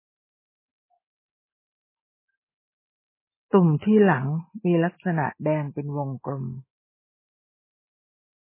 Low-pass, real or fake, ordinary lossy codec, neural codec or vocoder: 3.6 kHz; real; MP3, 16 kbps; none